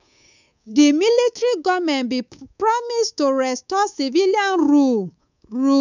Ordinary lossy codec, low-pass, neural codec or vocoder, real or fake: none; 7.2 kHz; codec, 24 kHz, 3.1 kbps, DualCodec; fake